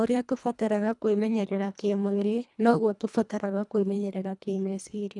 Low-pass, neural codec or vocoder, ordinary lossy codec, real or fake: 10.8 kHz; codec, 24 kHz, 1.5 kbps, HILCodec; none; fake